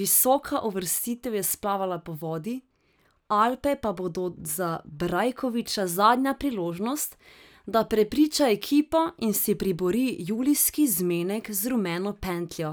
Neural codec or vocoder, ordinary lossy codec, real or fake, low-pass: none; none; real; none